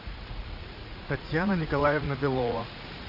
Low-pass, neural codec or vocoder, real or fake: 5.4 kHz; vocoder, 44.1 kHz, 80 mel bands, Vocos; fake